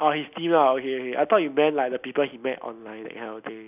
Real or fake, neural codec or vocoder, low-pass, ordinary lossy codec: real; none; 3.6 kHz; none